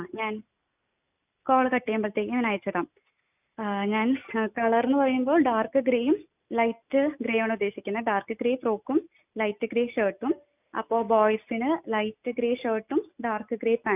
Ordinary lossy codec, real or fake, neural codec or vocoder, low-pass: none; real; none; 3.6 kHz